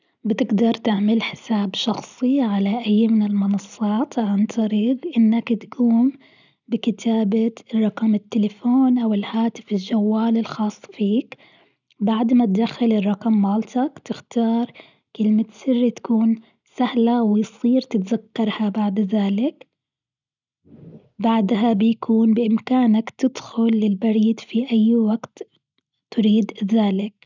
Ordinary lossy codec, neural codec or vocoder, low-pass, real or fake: none; none; 7.2 kHz; real